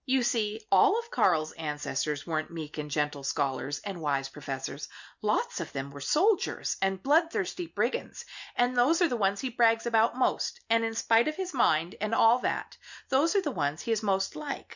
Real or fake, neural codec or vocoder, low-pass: real; none; 7.2 kHz